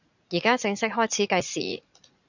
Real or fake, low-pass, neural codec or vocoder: fake; 7.2 kHz; vocoder, 44.1 kHz, 80 mel bands, Vocos